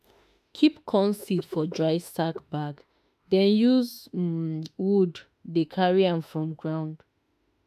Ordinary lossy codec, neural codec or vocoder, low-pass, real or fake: none; autoencoder, 48 kHz, 32 numbers a frame, DAC-VAE, trained on Japanese speech; 14.4 kHz; fake